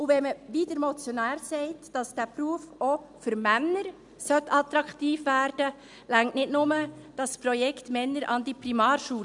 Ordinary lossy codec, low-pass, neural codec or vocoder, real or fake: none; 10.8 kHz; none; real